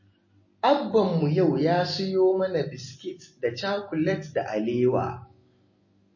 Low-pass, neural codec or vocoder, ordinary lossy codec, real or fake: 7.2 kHz; none; MP3, 32 kbps; real